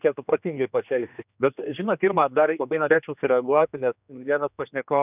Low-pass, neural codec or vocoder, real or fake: 3.6 kHz; codec, 16 kHz, 2 kbps, X-Codec, HuBERT features, trained on general audio; fake